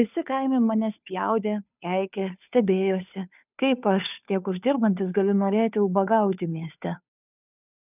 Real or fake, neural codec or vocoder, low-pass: fake; codec, 16 kHz, 2 kbps, FunCodec, trained on Chinese and English, 25 frames a second; 3.6 kHz